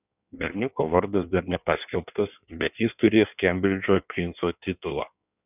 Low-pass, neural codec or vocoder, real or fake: 3.6 kHz; codec, 16 kHz in and 24 kHz out, 1.1 kbps, FireRedTTS-2 codec; fake